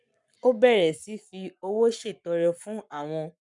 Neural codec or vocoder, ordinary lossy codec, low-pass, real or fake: codec, 44.1 kHz, 7.8 kbps, Pupu-Codec; none; 10.8 kHz; fake